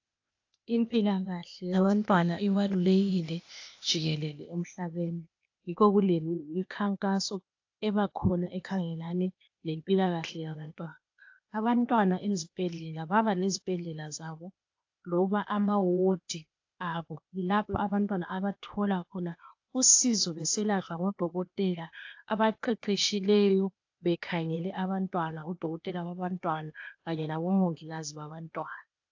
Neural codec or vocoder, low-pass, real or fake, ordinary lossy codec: codec, 16 kHz, 0.8 kbps, ZipCodec; 7.2 kHz; fake; AAC, 48 kbps